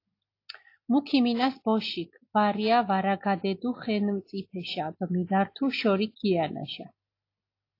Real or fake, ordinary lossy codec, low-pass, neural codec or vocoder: real; AAC, 32 kbps; 5.4 kHz; none